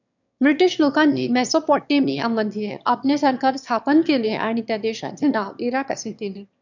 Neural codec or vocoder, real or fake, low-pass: autoencoder, 22.05 kHz, a latent of 192 numbers a frame, VITS, trained on one speaker; fake; 7.2 kHz